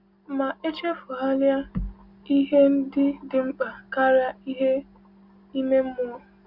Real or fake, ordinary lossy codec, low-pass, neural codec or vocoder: real; Opus, 64 kbps; 5.4 kHz; none